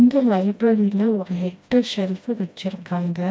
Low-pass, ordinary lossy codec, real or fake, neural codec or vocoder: none; none; fake; codec, 16 kHz, 1 kbps, FreqCodec, smaller model